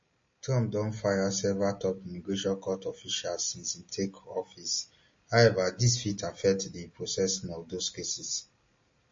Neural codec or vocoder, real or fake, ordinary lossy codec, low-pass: none; real; MP3, 32 kbps; 7.2 kHz